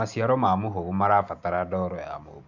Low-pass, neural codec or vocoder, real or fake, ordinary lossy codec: 7.2 kHz; none; real; MP3, 64 kbps